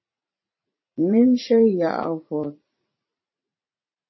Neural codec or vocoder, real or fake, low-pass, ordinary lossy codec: vocoder, 44.1 kHz, 80 mel bands, Vocos; fake; 7.2 kHz; MP3, 24 kbps